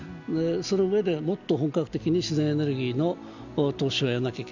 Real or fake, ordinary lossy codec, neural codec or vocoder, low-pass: real; none; none; 7.2 kHz